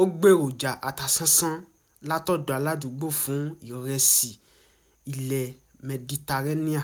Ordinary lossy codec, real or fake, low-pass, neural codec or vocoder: none; real; none; none